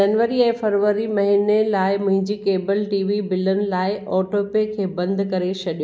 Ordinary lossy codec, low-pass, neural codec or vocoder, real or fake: none; none; none; real